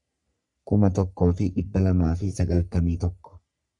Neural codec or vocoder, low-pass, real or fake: codec, 44.1 kHz, 3.4 kbps, Pupu-Codec; 10.8 kHz; fake